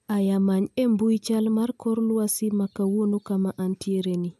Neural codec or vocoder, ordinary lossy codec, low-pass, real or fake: none; none; 14.4 kHz; real